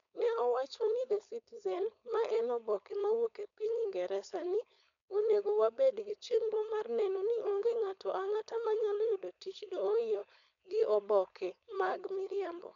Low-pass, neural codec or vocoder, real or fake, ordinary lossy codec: 7.2 kHz; codec, 16 kHz, 4.8 kbps, FACodec; fake; none